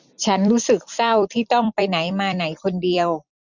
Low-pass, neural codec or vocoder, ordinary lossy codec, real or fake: 7.2 kHz; vocoder, 44.1 kHz, 128 mel bands every 256 samples, BigVGAN v2; none; fake